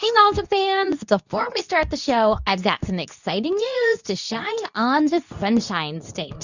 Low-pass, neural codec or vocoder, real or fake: 7.2 kHz; codec, 24 kHz, 0.9 kbps, WavTokenizer, medium speech release version 2; fake